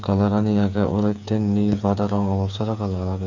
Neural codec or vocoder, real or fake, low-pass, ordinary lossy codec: codec, 16 kHz, 8 kbps, FreqCodec, smaller model; fake; 7.2 kHz; none